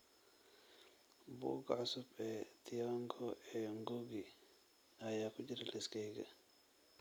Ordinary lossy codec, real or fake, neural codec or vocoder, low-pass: none; real; none; none